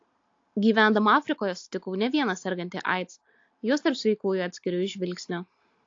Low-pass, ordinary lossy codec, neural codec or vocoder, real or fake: 7.2 kHz; AAC, 48 kbps; none; real